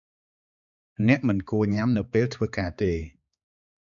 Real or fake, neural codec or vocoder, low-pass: fake; codec, 16 kHz, 4 kbps, X-Codec, HuBERT features, trained on balanced general audio; 7.2 kHz